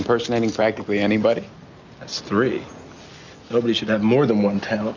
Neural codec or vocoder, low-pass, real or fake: none; 7.2 kHz; real